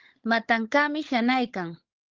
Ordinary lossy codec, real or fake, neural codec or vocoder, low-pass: Opus, 16 kbps; fake; codec, 16 kHz, 16 kbps, FunCodec, trained on LibriTTS, 50 frames a second; 7.2 kHz